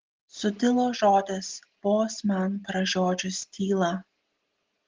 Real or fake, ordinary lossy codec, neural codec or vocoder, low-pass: real; Opus, 16 kbps; none; 7.2 kHz